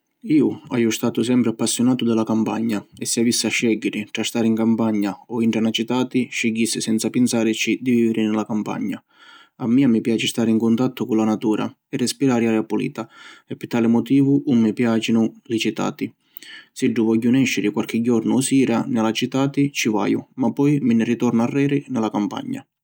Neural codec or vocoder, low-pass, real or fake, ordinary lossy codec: none; none; real; none